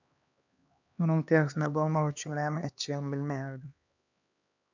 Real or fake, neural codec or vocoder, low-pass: fake; codec, 16 kHz, 2 kbps, X-Codec, HuBERT features, trained on LibriSpeech; 7.2 kHz